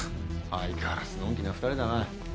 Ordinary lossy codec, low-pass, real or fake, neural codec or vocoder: none; none; real; none